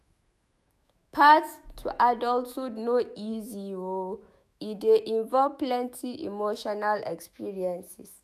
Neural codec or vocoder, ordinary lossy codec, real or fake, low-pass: autoencoder, 48 kHz, 128 numbers a frame, DAC-VAE, trained on Japanese speech; none; fake; 14.4 kHz